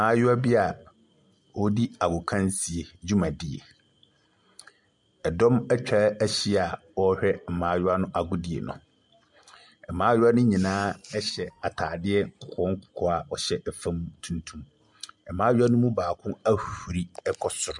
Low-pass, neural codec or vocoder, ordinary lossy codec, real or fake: 10.8 kHz; none; MP3, 96 kbps; real